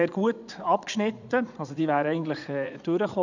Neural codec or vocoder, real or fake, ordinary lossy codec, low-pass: vocoder, 44.1 kHz, 80 mel bands, Vocos; fake; none; 7.2 kHz